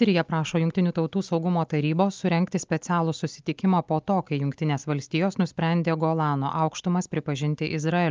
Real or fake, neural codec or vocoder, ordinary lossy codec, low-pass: real; none; Opus, 24 kbps; 7.2 kHz